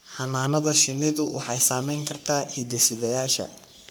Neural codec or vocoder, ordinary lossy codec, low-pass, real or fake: codec, 44.1 kHz, 3.4 kbps, Pupu-Codec; none; none; fake